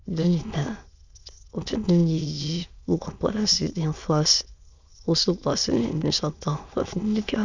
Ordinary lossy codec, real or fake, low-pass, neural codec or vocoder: none; fake; 7.2 kHz; autoencoder, 22.05 kHz, a latent of 192 numbers a frame, VITS, trained on many speakers